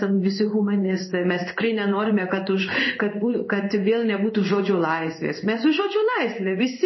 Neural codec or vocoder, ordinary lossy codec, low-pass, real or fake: codec, 16 kHz in and 24 kHz out, 1 kbps, XY-Tokenizer; MP3, 24 kbps; 7.2 kHz; fake